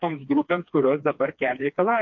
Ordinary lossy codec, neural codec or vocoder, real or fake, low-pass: MP3, 48 kbps; codec, 16 kHz, 2 kbps, FreqCodec, smaller model; fake; 7.2 kHz